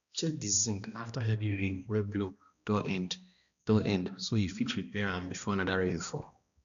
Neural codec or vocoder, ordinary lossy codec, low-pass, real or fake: codec, 16 kHz, 1 kbps, X-Codec, HuBERT features, trained on balanced general audio; none; 7.2 kHz; fake